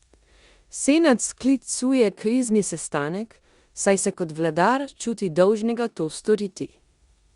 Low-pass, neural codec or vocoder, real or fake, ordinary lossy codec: 10.8 kHz; codec, 16 kHz in and 24 kHz out, 0.9 kbps, LongCat-Audio-Codec, four codebook decoder; fake; Opus, 64 kbps